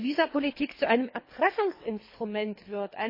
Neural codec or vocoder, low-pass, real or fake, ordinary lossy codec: codec, 24 kHz, 3 kbps, HILCodec; 5.4 kHz; fake; MP3, 24 kbps